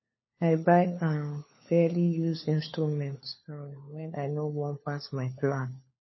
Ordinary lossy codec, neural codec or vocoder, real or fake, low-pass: MP3, 24 kbps; codec, 16 kHz, 4 kbps, FunCodec, trained on LibriTTS, 50 frames a second; fake; 7.2 kHz